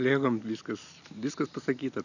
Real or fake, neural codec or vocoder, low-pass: real; none; 7.2 kHz